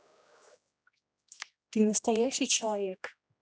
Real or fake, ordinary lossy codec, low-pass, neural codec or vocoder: fake; none; none; codec, 16 kHz, 1 kbps, X-Codec, HuBERT features, trained on general audio